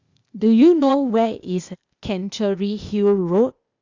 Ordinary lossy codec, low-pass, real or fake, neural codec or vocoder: Opus, 64 kbps; 7.2 kHz; fake; codec, 16 kHz, 0.8 kbps, ZipCodec